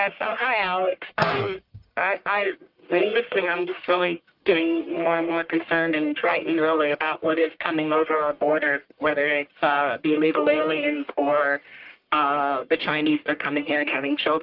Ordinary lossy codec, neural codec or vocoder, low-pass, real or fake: Opus, 32 kbps; codec, 44.1 kHz, 1.7 kbps, Pupu-Codec; 5.4 kHz; fake